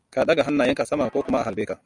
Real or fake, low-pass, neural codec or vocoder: real; 10.8 kHz; none